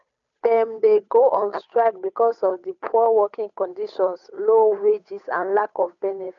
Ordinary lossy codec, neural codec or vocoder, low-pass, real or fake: none; codec, 16 kHz, 8 kbps, FunCodec, trained on Chinese and English, 25 frames a second; 7.2 kHz; fake